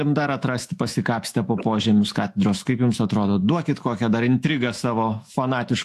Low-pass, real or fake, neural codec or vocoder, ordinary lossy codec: 14.4 kHz; fake; autoencoder, 48 kHz, 128 numbers a frame, DAC-VAE, trained on Japanese speech; AAC, 64 kbps